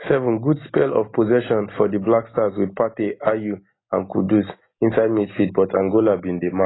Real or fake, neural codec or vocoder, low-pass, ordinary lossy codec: real; none; 7.2 kHz; AAC, 16 kbps